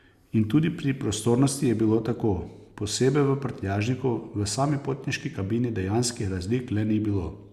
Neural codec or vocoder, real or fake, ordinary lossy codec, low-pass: none; real; Opus, 64 kbps; 14.4 kHz